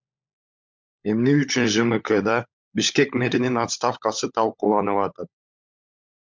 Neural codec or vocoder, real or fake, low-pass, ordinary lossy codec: codec, 16 kHz, 16 kbps, FunCodec, trained on LibriTTS, 50 frames a second; fake; 7.2 kHz; MP3, 64 kbps